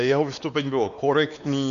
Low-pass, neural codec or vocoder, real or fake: 7.2 kHz; codec, 16 kHz, 2 kbps, X-Codec, WavLM features, trained on Multilingual LibriSpeech; fake